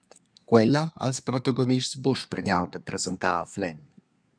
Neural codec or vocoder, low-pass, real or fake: codec, 24 kHz, 1 kbps, SNAC; 9.9 kHz; fake